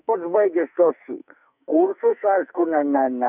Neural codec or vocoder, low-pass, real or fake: codec, 44.1 kHz, 2.6 kbps, SNAC; 3.6 kHz; fake